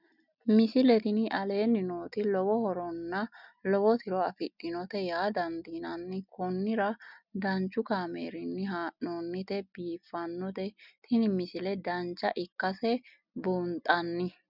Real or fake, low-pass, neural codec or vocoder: real; 5.4 kHz; none